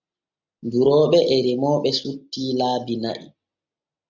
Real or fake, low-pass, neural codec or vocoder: real; 7.2 kHz; none